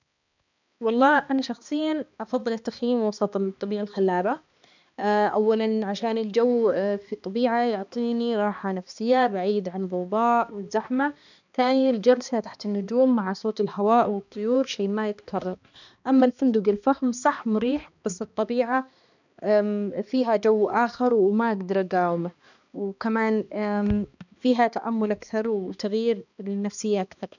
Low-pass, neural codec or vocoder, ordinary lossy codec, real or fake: 7.2 kHz; codec, 16 kHz, 2 kbps, X-Codec, HuBERT features, trained on balanced general audio; none; fake